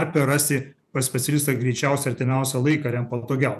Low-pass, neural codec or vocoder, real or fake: 14.4 kHz; vocoder, 48 kHz, 128 mel bands, Vocos; fake